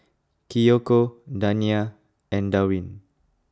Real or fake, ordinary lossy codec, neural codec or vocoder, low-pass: real; none; none; none